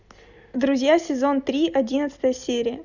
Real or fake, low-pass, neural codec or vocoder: real; 7.2 kHz; none